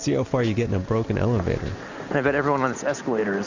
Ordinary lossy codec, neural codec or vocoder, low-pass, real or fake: Opus, 64 kbps; none; 7.2 kHz; real